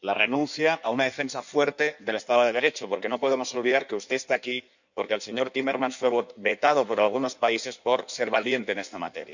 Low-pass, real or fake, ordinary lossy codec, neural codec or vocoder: 7.2 kHz; fake; none; codec, 16 kHz in and 24 kHz out, 1.1 kbps, FireRedTTS-2 codec